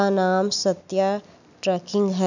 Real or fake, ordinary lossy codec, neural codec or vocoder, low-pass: real; none; none; 7.2 kHz